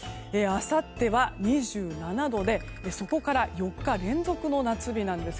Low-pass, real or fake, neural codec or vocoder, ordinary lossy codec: none; real; none; none